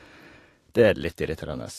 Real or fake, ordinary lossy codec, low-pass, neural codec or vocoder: real; AAC, 48 kbps; 14.4 kHz; none